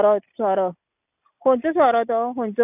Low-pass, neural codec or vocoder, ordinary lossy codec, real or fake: 3.6 kHz; none; none; real